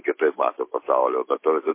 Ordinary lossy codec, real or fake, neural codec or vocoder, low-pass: MP3, 24 kbps; real; none; 3.6 kHz